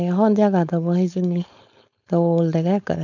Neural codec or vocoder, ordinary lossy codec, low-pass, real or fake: codec, 16 kHz, 4.8 kbps, FACodec; none; 7.2 kHz; fake